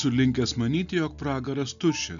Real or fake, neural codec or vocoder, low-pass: real; none; 7.2 kHz